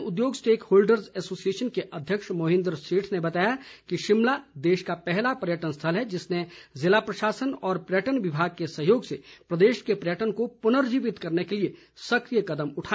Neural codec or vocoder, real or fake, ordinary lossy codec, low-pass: none; real; none; none